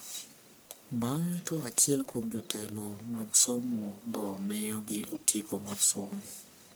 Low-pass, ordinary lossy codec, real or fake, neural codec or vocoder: none; none; fake; codec, 44.1 kHz, 1.7 kbps, Pupu-Codec